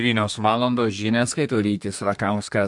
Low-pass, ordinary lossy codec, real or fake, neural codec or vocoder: 10.8 kHz; MP3, 64 kbps; fake; codec, 32 kHz, 1.9 kbps, SNAC